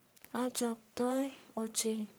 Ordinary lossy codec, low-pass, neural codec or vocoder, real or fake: none; none; codec, 44.1 kHz, 1.7 kbps, Pupu-Codec; fake